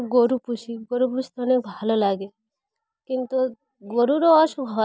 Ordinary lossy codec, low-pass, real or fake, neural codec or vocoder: none; none; real; none